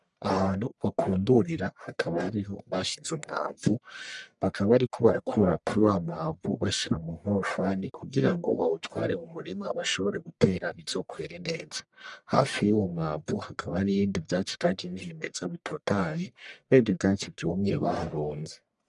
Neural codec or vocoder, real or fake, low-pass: codec, 44.1 kHz, 1.7 kbps, Pupu-Codec; fake; 10.8 kHz